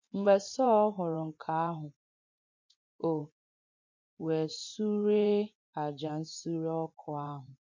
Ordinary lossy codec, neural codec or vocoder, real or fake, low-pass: MP3, 48 kbps; vocoder, 24 kHz, 100 mel bands, Vocos; fake; 7.2 kHz